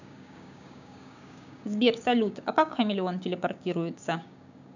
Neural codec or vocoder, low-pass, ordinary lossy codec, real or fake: codec, 16 kHz, 6 kbps, DAC; 7.2 kHz; none; fake